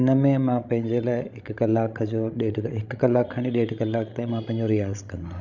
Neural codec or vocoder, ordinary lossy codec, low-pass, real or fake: codec, 16 kHz, 16 kbps, FreqCodec, larger model; none; 7.2 kHz; fake